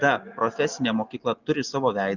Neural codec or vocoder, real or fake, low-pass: none; real; 7.2 kHz